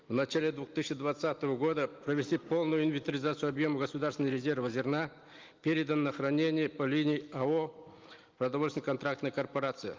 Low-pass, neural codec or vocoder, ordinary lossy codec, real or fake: 7.2 kHz; none; Opus, 32 kbps; real